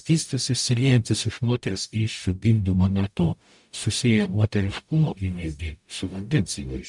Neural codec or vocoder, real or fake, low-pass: codec, 44.1 kHz, 0.9 kbps, DAC; fake; 10.8 kHz